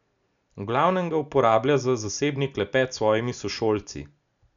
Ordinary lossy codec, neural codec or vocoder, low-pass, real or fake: none; none; 7.2 kHz; real